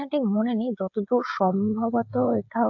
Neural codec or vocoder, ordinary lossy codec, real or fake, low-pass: codec, 16 kHz, 16 kbps, FreqCodec, smaller model; AAC, 48 kbps; fake; 7.2 kHz